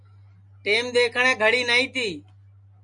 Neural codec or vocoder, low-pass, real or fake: none; 10.8 kHz; real